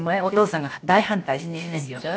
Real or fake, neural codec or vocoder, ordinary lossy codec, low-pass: fake; codec, 16 kHz, about 1 kbps, DyCAST, with the encoder's durations; none; none